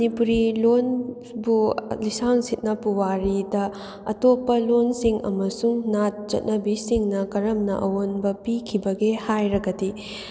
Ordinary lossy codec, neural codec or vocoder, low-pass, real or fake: none; none; none; real